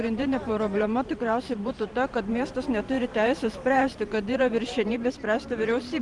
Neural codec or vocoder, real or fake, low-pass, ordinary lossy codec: vocoder, 44.1 kHz, 128 mel bands every 512 samples, BigVGAN v2; fake; 10.8 kHz; Opus, 24 kbps